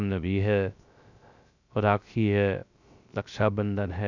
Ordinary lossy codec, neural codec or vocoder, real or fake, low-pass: none; codec, 16 kHz, 0.3 kbps, FocalCodec; fake; 7.2 kHz